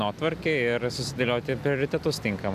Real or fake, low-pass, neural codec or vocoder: real; 14.4 kHz; none